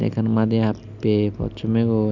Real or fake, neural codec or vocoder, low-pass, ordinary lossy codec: real; none; 7.2 kHz; none